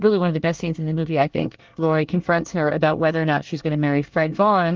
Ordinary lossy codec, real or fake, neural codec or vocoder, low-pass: Opus, 32 kbps; fake; codec, 24 kHz, 1 kbps, SNAC; 7.2 kHz